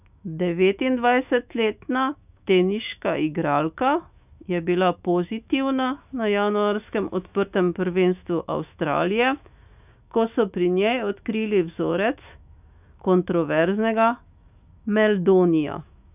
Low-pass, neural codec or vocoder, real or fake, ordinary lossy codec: 3.6 kHz; autoencoder, 48 kHz, 128 numbers a frame, DAC-VAE, trained on Japanese speech; fake; none